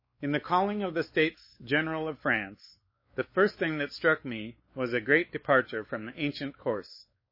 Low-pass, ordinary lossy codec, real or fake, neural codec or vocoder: 5.4 kHz; MP3, 24 kbps; fake; codec, 16 kHz, 4 kbps, X-Codec, WavLM features, trained on Multilingual LibriSpeech